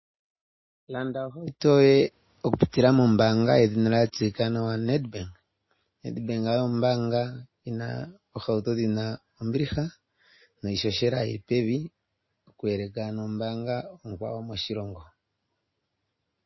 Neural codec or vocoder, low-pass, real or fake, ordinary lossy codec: none; 7.2 kHz; real; MP3, 24 kbps